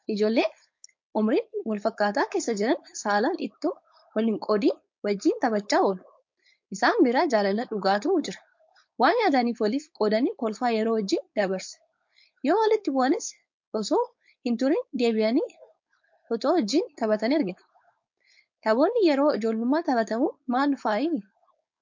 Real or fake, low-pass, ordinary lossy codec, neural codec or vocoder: fake; 7.2 kHz; MP3, 48 kbps; codec, 16 kHz, 4.8 kbps, FACodec